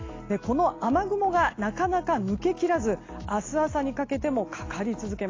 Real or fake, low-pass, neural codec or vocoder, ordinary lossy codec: real; 7.2 kHz; none; AAC, 32 kbps